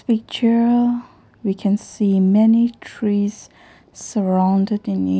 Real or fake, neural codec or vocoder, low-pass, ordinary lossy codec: real; none; none; none